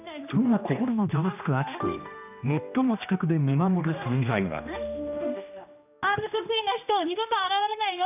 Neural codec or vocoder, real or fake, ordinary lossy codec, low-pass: codec, 16 kHz, 1 kbps, X-Codec, HuBERT features, trained on general audio; fake; none; 3.6 kHz